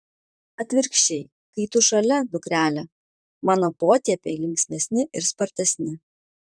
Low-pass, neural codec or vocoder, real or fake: 9.9 kHz; vocoder, 44.1 kHz, 128 mel bands, Pupu-Vocoder; fake